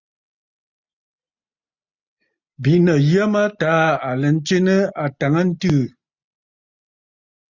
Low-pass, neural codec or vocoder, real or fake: 7.2 kHz; none; real